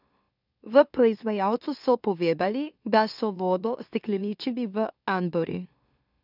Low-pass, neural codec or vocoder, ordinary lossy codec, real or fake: 5.4 kHz; autoencoder, 44.1 kHz, a latent of 192 numbers a frame, MeloTTS; none; fake